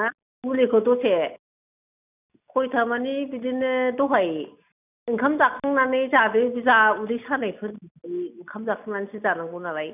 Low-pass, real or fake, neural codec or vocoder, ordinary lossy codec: 3.6 kHz; real; none; none